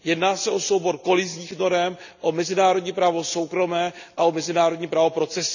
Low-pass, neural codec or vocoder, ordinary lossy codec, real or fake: 7.2 kHz; none; none; real